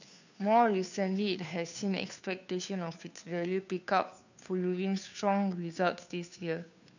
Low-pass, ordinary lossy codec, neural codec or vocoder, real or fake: 7.2 kHz; none; codec, 16 kHz, 2 kbps, FunCodec, trained on Chinese and English, 25 frames a second; fake